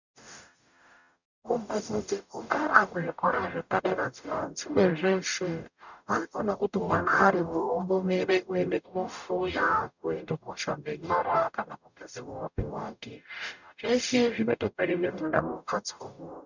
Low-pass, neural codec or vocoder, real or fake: 7.2 kHz; codec, 44.1 kHz, 0.9 kbps, DAC; fake